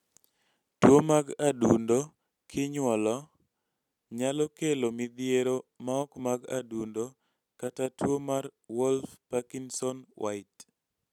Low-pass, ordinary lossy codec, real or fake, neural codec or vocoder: 19.8 kHz; none; real; none